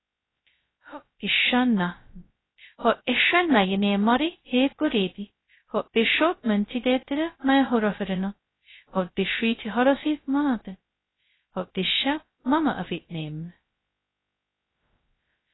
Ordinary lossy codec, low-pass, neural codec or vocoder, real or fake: AAC, 16 kbps; 7.2 kHz; codec, 16 kHz, 0.2 kbps, FocalCodec; fake